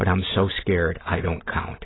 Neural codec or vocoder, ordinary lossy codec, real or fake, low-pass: none; AAC, 16 kbps; real; 7.2 kHz